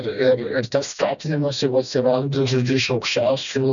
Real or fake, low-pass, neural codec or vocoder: fake; 7.2 kHz; codec, 16 kHz, 1 kbps, FreqCodec, smaller model